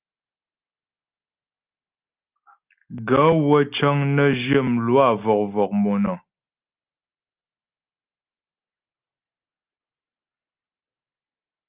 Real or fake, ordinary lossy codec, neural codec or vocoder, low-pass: real; Opus, 32 kbps; none; 3.6 kHz